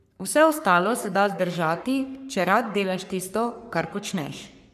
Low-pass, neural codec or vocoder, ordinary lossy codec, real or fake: 14.4 kHz; codec, 44.1 kHz, 3.4 kbps, Pupu-Codec; none; fake